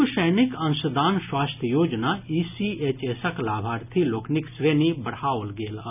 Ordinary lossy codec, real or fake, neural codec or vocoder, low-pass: none; real; none; 3.6 kHz